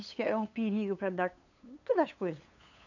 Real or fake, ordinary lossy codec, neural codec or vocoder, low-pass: fake; none; codec, 16 kHz, 8 kbps, FunCodec, trained on LibriTTS, 25 frames a second; 7.2 kHz